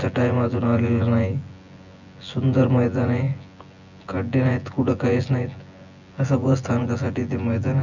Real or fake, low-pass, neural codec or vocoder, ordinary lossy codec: fake; 7.2 kHz; vocoder, 24 kHz, 100 mel bands, Vocos; none